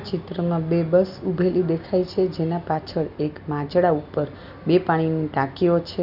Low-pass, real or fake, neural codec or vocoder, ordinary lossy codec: 5.4 kHz; real; none; none